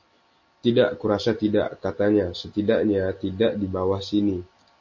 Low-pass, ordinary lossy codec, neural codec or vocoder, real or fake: 7.2 kHz; MP3, 32 kbps; none; real